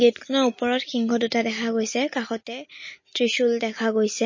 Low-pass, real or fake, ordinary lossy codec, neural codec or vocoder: 7.2 kHz; real; MP3, 32 kbps; none